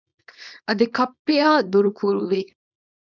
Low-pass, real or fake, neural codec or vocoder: 7.2 kHz; fake; codec, 24 kHz, 0.9 kbps, WavTokenizer, small release